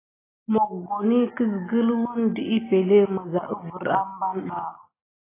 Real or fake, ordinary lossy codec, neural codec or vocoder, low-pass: real; AAC, 16 kbps; none; 3.6 kHz